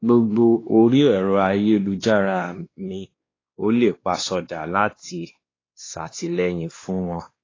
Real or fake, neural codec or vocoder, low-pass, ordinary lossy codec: fake; codec, 16 kHz, 2 kbps, X-Codec, WavLM features, trained on Multilingual LibriSpeech; 7.2 kHz; AAC, 32 kbps